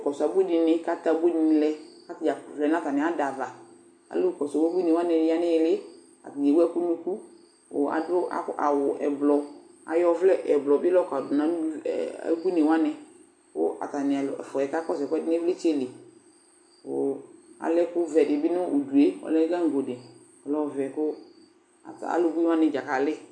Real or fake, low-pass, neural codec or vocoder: real; 9.9 kHz; none